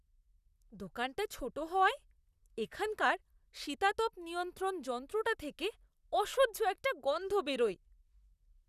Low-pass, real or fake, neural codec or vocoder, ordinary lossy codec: 14.4 kHz; fake; vocoder, 44.1 kHz, 128 mel bands, Pupu-Vocoder; none